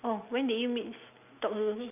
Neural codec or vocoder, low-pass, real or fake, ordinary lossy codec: none; 3.6 kHz; real; none